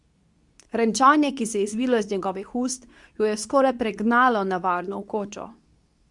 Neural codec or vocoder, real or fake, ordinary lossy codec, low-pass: codec, 24 kHz, 0.9 kbps, WavTokenizer, medium speech release version 2; fake; Opus, 64 kbps; 10.8 kHz